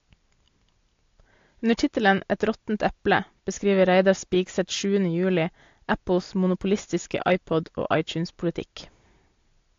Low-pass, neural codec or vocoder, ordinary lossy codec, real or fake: 7.2 kHz; none; AAC, 48 kbps; real